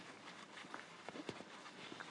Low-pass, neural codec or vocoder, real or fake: 10.8 kHz; codec, 44.1 kHz, 7.8 kbps, Pupu-Codec; fake